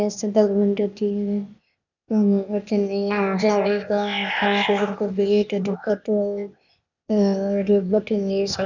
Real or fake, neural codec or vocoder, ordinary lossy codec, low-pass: fake; codec, 16 kHz, 0.8 kbps, ZipCodec; none; 7.2 kHz